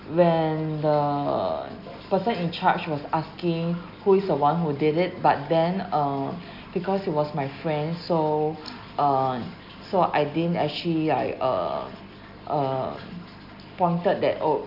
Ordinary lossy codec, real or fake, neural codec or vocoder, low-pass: none; real; none; 5.4 kHz